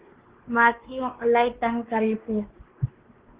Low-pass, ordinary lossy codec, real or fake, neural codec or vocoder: 3.6 kHz; Opus, 16 kbps; fake; codec, 16 kHz, 2 kbps, X-Codec, WavLM features, trained on Multilingual LibriSpeech